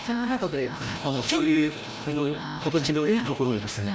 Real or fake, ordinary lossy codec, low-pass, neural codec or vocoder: fake; none; none; codec, 16 kHz, 0.5 kbps, FreqCodec, larger model